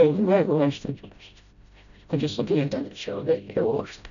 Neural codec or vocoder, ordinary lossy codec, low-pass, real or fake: codec, 16 kHz, 0.5 kbps, FreqCodec, smaller model; none; 7.2 kHz; fake